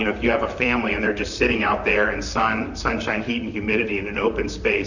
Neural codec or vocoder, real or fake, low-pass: vocoder, 44.1 kHz, 128 mel bands, Pupu-Vocoder; fake; 7.2 kHz